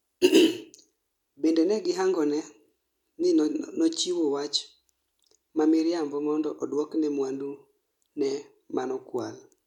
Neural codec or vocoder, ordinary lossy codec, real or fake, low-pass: none; none; real; 19.8 kHz